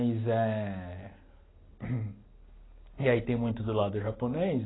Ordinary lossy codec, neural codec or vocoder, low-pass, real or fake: AAC, 16 kbps; none; 7.2 kHz; real